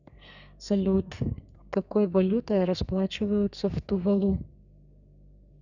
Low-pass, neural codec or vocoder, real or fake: 7.2 kHz; codec, 32 kHz, 1.9 kbps, SNAC; fake